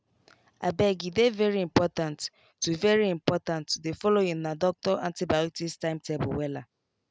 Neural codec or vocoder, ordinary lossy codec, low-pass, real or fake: none; none; none; real